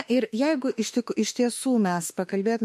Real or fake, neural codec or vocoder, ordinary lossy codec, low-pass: fake; autoencoder, 48 kHz, 32 numbers a frame, DAC-VAE, trained on Japanese speech; MP3, 64 kbps; 14.4 kHz